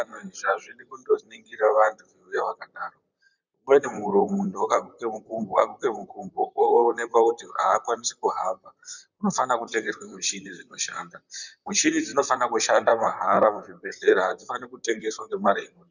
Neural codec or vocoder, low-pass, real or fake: vocoder, 44.1 kHz, 128 mel bands, Pupu-Vocoder; 7.2 kHz; fake